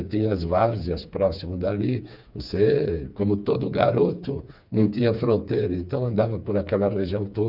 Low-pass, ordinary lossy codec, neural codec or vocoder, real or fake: 5.4 kHz; none; codec, 16 kHz, 4 kbps, FreqCodec, smaller model; fake